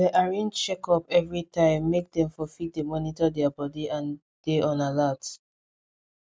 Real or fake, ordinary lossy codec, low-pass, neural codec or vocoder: real; none; none; none